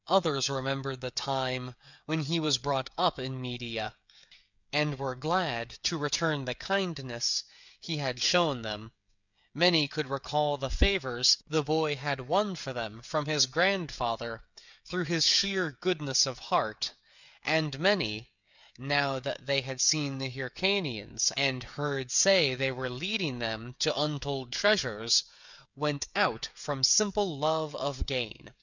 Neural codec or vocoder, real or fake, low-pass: codec, 16 kHz, 16 kbps, FreqCodec, smaller model; fake; 7.2 kHz